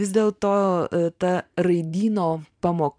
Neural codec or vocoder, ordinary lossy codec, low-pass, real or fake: vocoder, 44.1 kHz, 128 mel bands every 512 samples, BigVGAN v2; MP3, 96 kbps; 9.9 kHz; fake